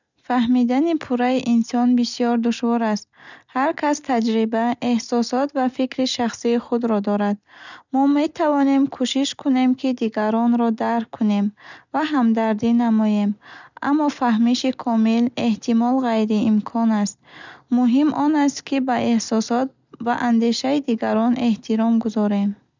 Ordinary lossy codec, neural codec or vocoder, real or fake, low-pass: none; none; real; 7.2 kHz